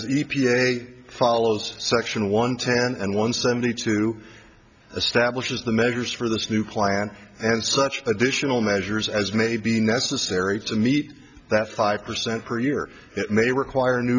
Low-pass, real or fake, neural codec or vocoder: 7.2 kHz; real; none